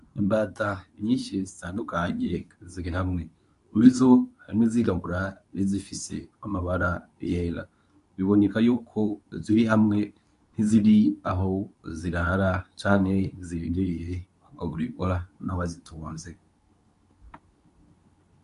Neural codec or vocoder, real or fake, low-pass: codec, 24 kHz, 0.9 kbps, WavTokenizer, medium speech release version 2; fake; 10.8 kHz